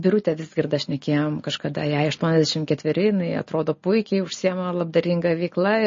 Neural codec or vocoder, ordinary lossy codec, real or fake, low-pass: none; MP3, 32 kbps; real; 7.2 kHz